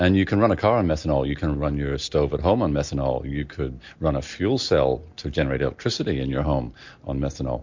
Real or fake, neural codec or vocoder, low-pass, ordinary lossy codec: real; none; 7.2 kHz; MP3, 48 kbps